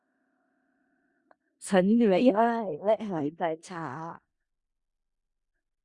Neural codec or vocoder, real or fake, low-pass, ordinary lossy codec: codec, 16 kHz in and 24 kHz out, 0.4 kbps, LongCat-Audio-Codec, four codebook decoder; fake; 10.8 kHz; Opus, 64 kbps